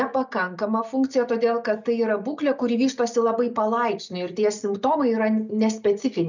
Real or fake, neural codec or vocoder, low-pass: real; none; 7.2 kHz